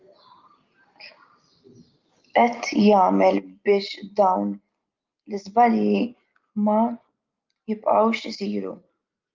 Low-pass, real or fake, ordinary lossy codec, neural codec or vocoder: 7.2 kHz; real; Opus, 24 kbps; none